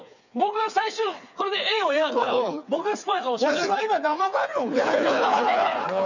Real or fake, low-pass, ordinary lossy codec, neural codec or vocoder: fake; 7.2 kHz; none; codec, 16 kHz, 4 kbps, FreqCodec, smaller model